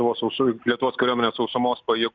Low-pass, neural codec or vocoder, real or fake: 7.2 kHz; none; real